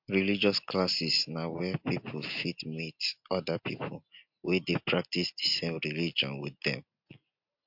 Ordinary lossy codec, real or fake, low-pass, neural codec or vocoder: MP3, 48 kbps; real; 5.4 kHz; none